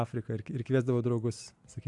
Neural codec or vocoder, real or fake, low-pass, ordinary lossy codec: none; real; 10.8 kHz; AAC, 64 kbps